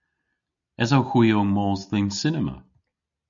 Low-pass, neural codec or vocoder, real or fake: 7.2 kHz; none; real